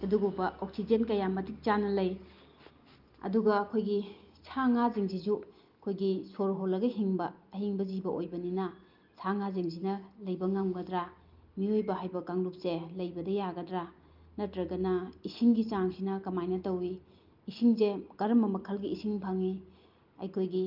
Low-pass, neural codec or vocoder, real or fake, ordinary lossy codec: 5.4 kHz; none; real; Opus, 24 kbps